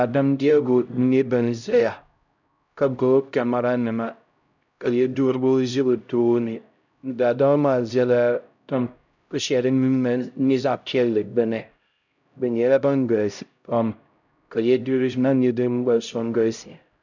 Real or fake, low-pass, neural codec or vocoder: fake; 7.2 kHz; codec, 16 kHz, 0.5 kbps, X-Codec, HuBERT features, trained on LibriSpeech